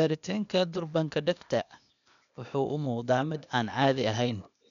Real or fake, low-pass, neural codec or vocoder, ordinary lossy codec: fake; 7.2 kHz; codec, 16 kHz, 0.8 kbps, ZipCodec; none